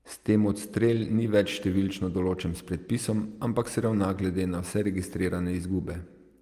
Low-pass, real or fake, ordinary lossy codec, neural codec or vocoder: 14.4 kHz; real; Opus, 32 kbps; none